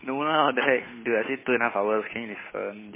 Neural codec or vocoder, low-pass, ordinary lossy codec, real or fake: codec, 16 kHz, 8 kbps, FunCodec, trained on Chinese and English, 25 frames a second; 3.6 kHz; MP3, 16 kbps; fake